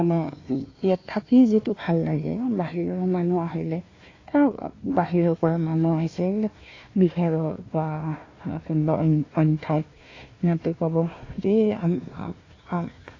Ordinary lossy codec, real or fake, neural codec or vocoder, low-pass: AAC, 32 kbps; fake; codec, 16 kHz, 1 kbps, FunCodec, trained on Chinese and English, 50 frames a second; 7.2 kHz